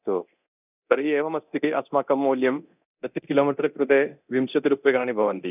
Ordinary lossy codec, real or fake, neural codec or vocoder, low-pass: none; fake; codec, 24 kHz, 0.9 kbps, DualCodec; 3.6 kHz